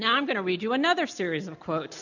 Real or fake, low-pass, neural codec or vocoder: real; 7.2 kHz; none